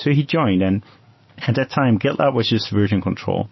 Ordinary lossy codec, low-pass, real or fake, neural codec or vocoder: MP3, 24 kbps; 7.2 kHz; real; none